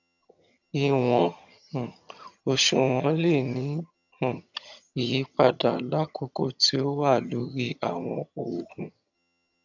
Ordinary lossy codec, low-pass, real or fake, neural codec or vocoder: none; 7.2 kHz; fake; vocoder, 22.05 kHz, 80 mel bands, HiFi-GAN